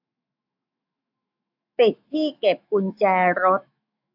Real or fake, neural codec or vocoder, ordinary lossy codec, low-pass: fake; autoencoder, 48 kHz, 128 numbers a frame, DAC-VAE, trained on Japanese speech; AAC, 48 kbps; 5.4 kHz